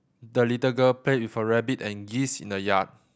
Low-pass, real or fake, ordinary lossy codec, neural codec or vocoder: none; real; none; none